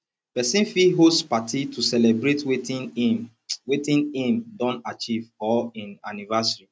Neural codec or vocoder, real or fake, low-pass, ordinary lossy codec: none; real; none; none